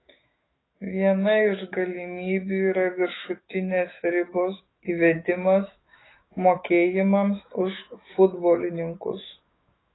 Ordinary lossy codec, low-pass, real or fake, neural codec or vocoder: AAC, 16 kbps; 7.2 kHz; fake; autoencoder, 48 kHz, 128 numbers a frame, DAC-VAE, trained on Japanese speech